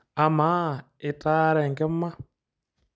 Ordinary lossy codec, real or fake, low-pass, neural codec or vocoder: none; real; none; none